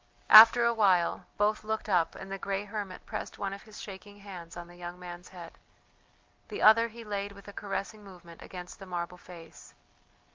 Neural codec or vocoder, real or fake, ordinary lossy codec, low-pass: none; real; Opus, 32 kbps; 7.2 kHz